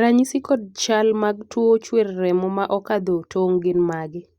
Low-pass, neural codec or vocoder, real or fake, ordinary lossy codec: 19.8 kHz; none; real; Opus, 64 kbps